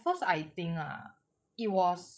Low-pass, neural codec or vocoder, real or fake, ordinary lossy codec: none; codec, 16 kHz, 16 kbps, FreqCodec, larger model; fake; none